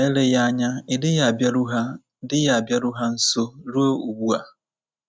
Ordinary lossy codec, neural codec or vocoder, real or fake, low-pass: none; none; real; none